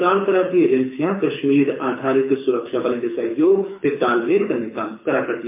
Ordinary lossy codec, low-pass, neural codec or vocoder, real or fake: MP3, 24 kbps; 3.6 kHz; codec, 24 kHz, 6 kbps, HILCodec; fake